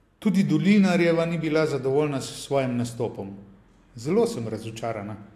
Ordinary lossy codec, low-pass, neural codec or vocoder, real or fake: AAC, 64 kbps; 14.4 kHz; none; real